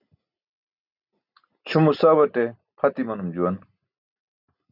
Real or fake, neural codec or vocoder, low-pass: real; none; 5.4 kHz